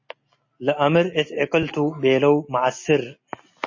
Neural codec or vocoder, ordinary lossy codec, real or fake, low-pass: none; MP3, 32 kbps; real; 7.2 kHz